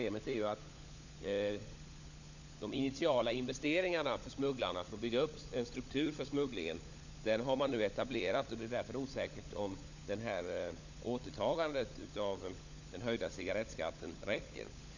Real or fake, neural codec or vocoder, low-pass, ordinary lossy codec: fake; codec, 16 kHz, 16 kbps, FunCodec, trained on LibriTTS, 50 frames a second; 7.2 kHz; none